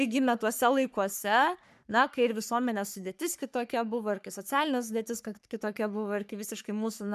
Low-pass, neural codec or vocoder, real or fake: 14.4 kHz; codec, 44.1 kHz, 3.4 kbps, Pupu-Codec; fake